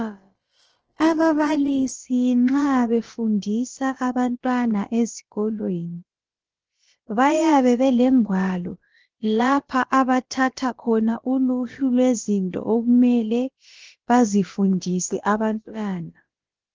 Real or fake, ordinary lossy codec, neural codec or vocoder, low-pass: fake; Opus, 16 kbps; codec, 16 kHz, about 1 kbps, DyCAST, with the encoder's durations; 7.2 kHz